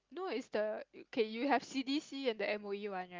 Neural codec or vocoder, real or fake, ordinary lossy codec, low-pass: none; real; Opus, 32 kbps; 7.2 kHz